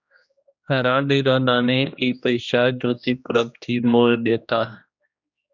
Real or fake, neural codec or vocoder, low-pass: fake; codec, 16 kHz, 1 kbps, X-Codec, HuBERT features, trained on general audio; 7.2 kHz